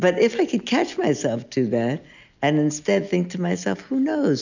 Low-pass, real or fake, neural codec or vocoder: 7.2 kHz; real; none